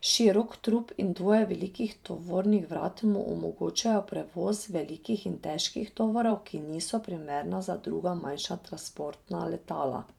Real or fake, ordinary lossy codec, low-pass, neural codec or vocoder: fake; none; 19.8 kHz; vocoder, 44.1 kHz, 128 mel bands every 512 samples, BigVGAN v2